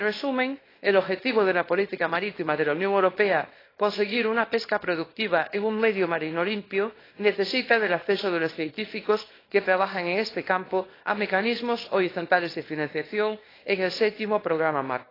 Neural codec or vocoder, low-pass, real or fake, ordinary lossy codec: codec, 24 kHz, 0.9 kbps, WavTokenizer, small release; 5.4 kHz; fake; AAC, 24 kbps